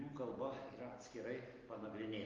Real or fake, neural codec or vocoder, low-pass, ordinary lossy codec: real; none; 7.2 kHz; Opus, 32 kbps